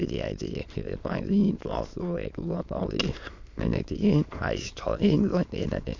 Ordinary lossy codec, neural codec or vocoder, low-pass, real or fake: MP3, 64 kbps; autoencoder, 22.05 kHz, a latent of 192 numbers a frame, VITS, trained on many speakers; 7.2 kHz; fake